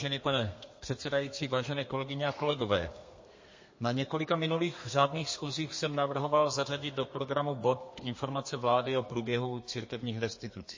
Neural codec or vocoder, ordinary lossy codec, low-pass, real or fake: codec, 32 kHz, 1.9 kbps, SNAC; MP3, 32 kbps; 7.2 kHz; fake